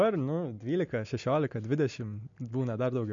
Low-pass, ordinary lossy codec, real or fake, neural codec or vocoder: 7.2 kHz; MP3, 48 kbps; real; none